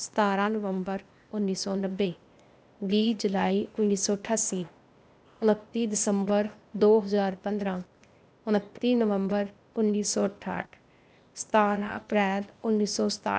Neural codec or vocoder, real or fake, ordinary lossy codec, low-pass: codec, 16 kHz, 0.8 kbps, ZipCodec; fake; none; none